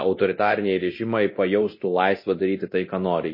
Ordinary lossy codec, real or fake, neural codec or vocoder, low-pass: MP3, 24 kbps; fake; codec, 24 kHz, 0.9 kbps, DualCodec; 5.4 kHz